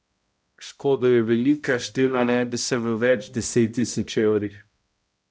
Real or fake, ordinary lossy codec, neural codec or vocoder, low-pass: fake; none; codec, 16 kHz, 0.5 kbps, X-Codec, HuBERT features, trained on balanced general audio; none